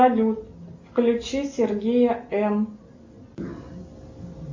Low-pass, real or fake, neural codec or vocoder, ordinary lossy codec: 7.2 kHz; real; none; MP3, 64 kbps